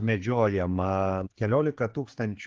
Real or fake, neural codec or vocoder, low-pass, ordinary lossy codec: fake; codec, 16 kHz, 4 kbps, X-Codec, HuBERT features, trained on general audio; 7.2 kHz; Opus, 16 kbps